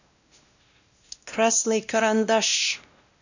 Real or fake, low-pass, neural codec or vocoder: fake; 7.2 kHz; codec, 16 kHz, 1 kbps, X-Codec, WavLM features, trained on Multilingual LibriSpeech